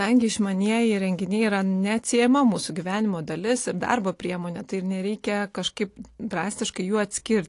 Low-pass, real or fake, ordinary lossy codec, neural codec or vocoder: 10.8 kHz; real; AAC, 48 kbps; none